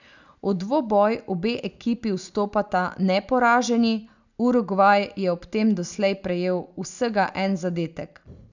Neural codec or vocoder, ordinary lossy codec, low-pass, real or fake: none; none; 7.2 kHz; real